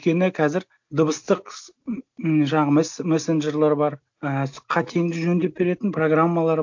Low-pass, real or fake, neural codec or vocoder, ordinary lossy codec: 7.2 kHz; real; none; MP3, 64 kbps